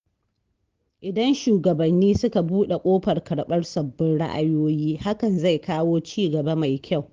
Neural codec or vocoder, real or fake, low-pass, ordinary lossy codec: none; real; 7.2 kHz; Opus, 16 kbps